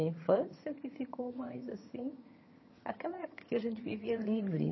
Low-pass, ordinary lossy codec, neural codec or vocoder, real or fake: 7.2 kHz; MP3, 24 kbps; vocoder, 22.05 kHz, 80 mel bands, HiFi-GAN; fake